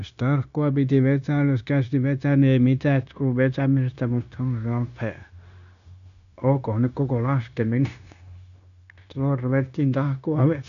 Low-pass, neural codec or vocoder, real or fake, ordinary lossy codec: 7.2 kHz; codec, 16 kHz, 0.9 kbps, LongCat-Audio-Codec; fake; none